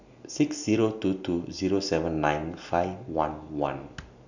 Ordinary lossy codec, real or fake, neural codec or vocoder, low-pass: none; real; none; 7.2 kHz